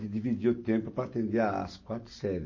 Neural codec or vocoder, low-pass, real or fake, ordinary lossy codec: none; 7.2 kHz; real; MP3, 32 kbps